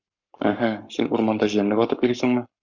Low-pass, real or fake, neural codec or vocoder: 7.2 kHz; fake; codec, 44.1 kHz, 7.8 kbps, Pupu-Codec